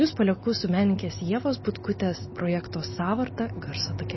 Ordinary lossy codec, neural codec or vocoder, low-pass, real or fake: MP3, 24 kbps; none; 7.2 kHz; real